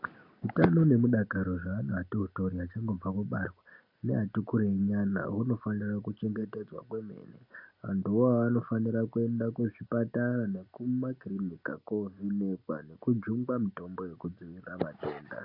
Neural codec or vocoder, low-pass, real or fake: none; 5.4 kHz; real